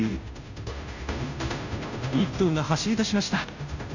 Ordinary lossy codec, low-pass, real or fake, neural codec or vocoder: none; 7.2 kHz; fake; codec, 16 kHz, 0.5 kbps, FunCodec, trained on Chinese and English, 25 frames a second